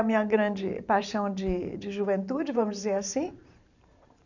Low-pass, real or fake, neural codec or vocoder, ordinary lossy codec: 7.2 kHz; fake; vocoder, 44.1 kHz, 128 mel bands every 512 samples, BigVGAN v2; none